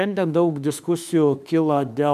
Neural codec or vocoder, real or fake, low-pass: autoencoder, 48 kHz, 32 numbers a frame, DAC-VAE, trained on Japanese speech; fake; 14.4 kHz